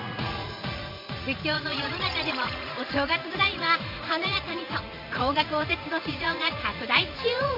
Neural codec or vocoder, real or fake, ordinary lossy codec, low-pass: vocoder, 44.1 kHz, 80 mel bands, Vocos; fake; MP3, 32 kbps; 5.4 kHz